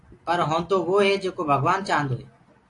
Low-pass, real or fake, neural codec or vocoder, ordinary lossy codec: 10.8 kHz; real; none; AAC, 48 kbps